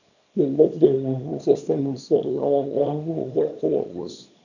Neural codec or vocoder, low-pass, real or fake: codec, 24 kHz, 0.9 kbps, WavTokenizer, small release; 7.2 kHz; fake